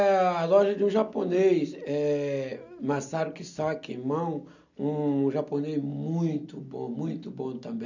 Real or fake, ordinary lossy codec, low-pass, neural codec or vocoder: real; none; 7.2 kHz; none